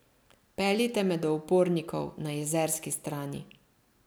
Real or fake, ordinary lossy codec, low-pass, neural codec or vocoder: real; none; none; none